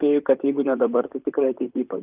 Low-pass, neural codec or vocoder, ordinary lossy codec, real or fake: 3.6 kHz; vocoder, 44.1 kHz, 128 mel bands, Pupu-Vocoder; Opus, 24 kbps; fake